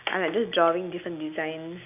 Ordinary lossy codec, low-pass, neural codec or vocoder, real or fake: none; 3.6 kHz; none; real